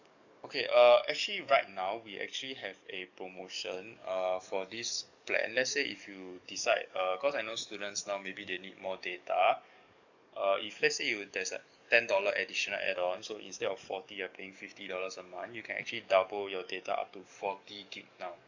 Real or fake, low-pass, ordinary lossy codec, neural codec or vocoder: fake; 7.2 kHz; none; codec, 44.1 kHz, 7.8 kbps, DAC